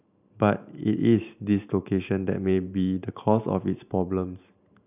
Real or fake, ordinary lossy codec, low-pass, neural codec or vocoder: fake; none; 3.6 kHz; vocoder, 44.1 kHz, 128 mel bands every 512 samples, BigVGAN v2